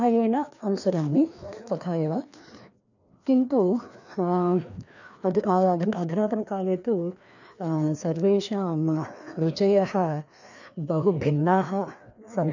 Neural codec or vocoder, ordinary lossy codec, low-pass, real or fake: codec, 16 kHz, 2 kbps, FreqCodec, larger model; none; 7.2 kHz; fake